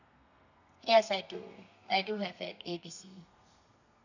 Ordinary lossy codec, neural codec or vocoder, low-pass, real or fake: none; codec, 32 kHz, 1.9 kbps, SNAC; 7.2 kHz; fake